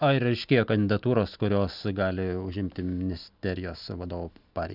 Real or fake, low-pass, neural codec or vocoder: real; 5.4 kHz; none